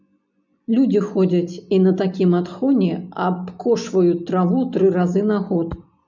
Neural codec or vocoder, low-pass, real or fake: none; 7.2 kHz; real